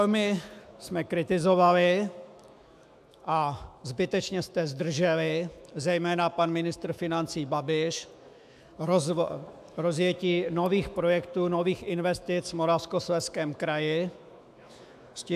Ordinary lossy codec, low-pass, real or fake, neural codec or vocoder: AAC, 96 kbps; 14.4 kHz; fake; autoencoder, 48 kHz, 128 numbers a frame, DAC-VAE, trained on Japanese speech